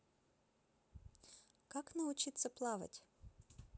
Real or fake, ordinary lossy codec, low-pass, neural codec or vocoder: real; none; none; none